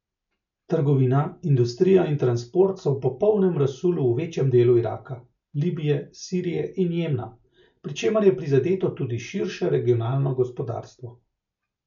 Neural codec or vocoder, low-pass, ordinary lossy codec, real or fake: none; 7.2 kHz; none; real